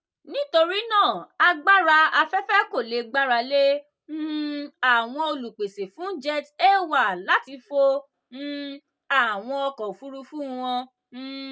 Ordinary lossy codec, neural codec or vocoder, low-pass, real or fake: none; none; none; real